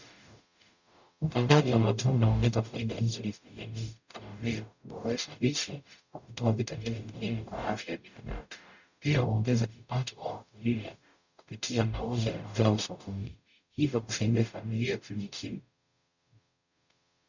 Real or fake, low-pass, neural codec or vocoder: fake; 7.2 kHz; codec, 44.1 kHz, 0.9 kbps, DAC